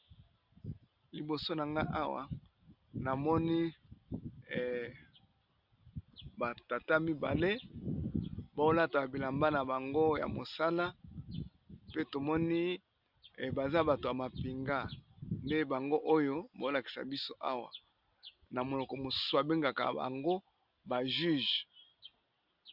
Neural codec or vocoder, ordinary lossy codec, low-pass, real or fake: none; Opus, 64 kbps; 5.4 kHz; real